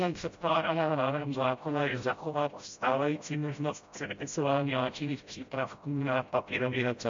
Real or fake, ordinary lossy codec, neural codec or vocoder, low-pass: fake; MP3, 48 kbps; codec, 16 kHz, 0.5 kbps, FreqCodec, smaller model; 7.2 kHz